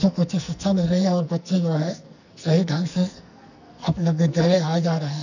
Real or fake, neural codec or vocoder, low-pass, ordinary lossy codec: fake; codec, 44.1 kHz, 2.6 kbps, SNAC; 7.2 kHz; none